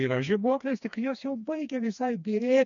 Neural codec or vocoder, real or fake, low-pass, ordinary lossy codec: codec, 16 kHz, 2 kbps, FreqCodec, smaller model; fake; 7.2 kHz; AAC, 64 kbps